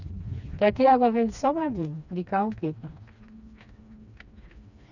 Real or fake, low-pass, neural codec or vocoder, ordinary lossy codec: fake; 7.2 kHz; codec, 16 kHz, 2 kbps, FreqCodec, smaller model; none